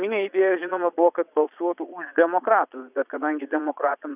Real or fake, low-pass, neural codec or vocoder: fake; 3.6 kHz; vocoder, 22.05 kHz, 80 mel bands, Vocos